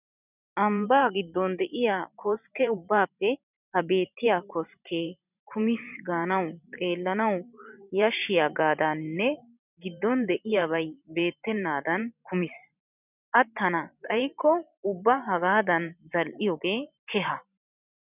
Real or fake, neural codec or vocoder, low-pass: fake; vocoder, 44.1 kHz, 128 mel bands every 512 samples, BigVGAN v2; 3.6 kHz